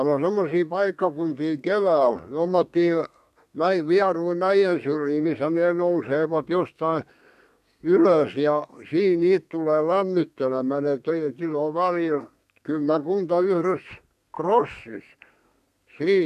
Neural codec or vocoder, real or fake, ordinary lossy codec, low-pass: codec, 32 kHz, 1.9 kbps, SNAC; fake; none; 14.4 kHz